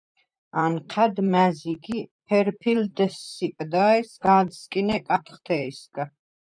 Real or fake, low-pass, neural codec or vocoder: fake; 9.9 kHz; vocoder, 44.1 kHz, 128 mel bands, Pupu-Vocoder